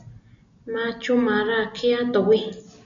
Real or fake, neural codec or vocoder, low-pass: real; none; 7.2 kHz